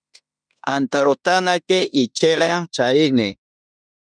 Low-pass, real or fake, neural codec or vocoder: 9.9 kHz; fake; codec, 16 kHz in and 24 kHz out, 0.9 kbps, LongCat-Audio-Codec, fine tuned four codebook decoder